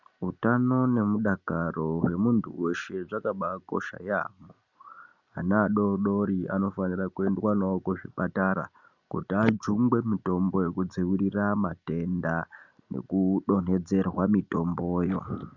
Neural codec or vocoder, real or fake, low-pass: none; real; 7.2 kHz